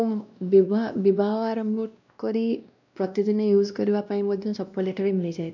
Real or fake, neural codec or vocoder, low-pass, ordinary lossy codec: fake; codec, 16 kHz, 1 kbps, X-Codec, WavLM features, trained on Multilingual LibriSpeech; 7.2 kHz; none